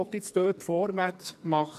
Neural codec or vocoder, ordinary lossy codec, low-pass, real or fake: codec, 44.1 kHz, 2.6 kbps, SNAC; none; 14.4 kHz; fake